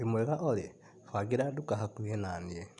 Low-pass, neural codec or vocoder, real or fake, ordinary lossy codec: 10.8 kHz; none; real; none